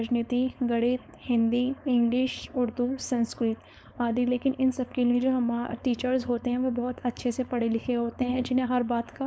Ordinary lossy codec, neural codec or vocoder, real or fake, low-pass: none; codec, 16 kHz, 4.8 kbps, FACodec; fake; none